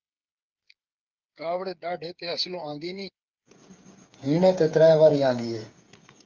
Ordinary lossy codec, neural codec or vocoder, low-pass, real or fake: Opus, 24 kbps; codec, 16 kHz, 8 kbps, FreqCodec, smaller model; 7.2 kHz; fake